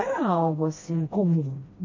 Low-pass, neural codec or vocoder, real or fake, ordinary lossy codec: 7.2 kHz; codec, 16 kHz, 1 kbps, FreqCodec, smaller model; fake; MP3, 32 kbps